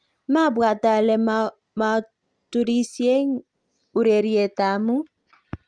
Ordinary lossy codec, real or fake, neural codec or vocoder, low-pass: Opus, 32 kbps; real; none; 9.9 kHz